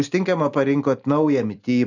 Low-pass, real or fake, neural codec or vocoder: 7.2 kHz; real; none